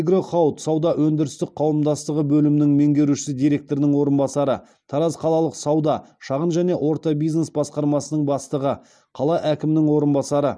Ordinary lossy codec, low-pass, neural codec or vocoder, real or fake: none; none; none; real